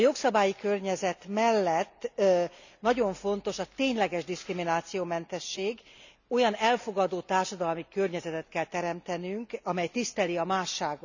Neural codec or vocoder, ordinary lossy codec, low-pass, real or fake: none; none; 7.2 kHz; real